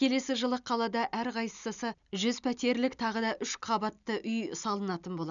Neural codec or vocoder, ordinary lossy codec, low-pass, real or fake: none; none; 7.2 kHz; real